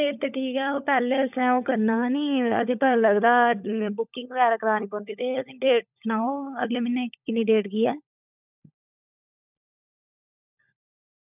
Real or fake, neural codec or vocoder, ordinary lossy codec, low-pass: fake; codec, 16 kHz, 16 kbps, FunCodec, trained on LibriTTS, 50 frames a second; none; 3.6 kHz